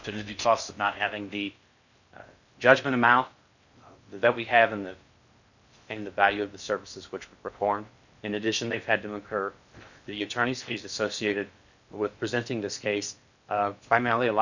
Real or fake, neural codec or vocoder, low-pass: fake; codec, 16 kHz in and 24 kHz out, 0.6 kbps, FocalCodec, streaming, 4096 codes; 7.2 kHz